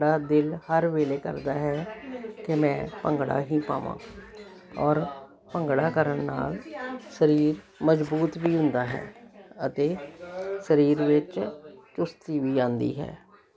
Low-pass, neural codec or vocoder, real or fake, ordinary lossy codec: none; none; real; none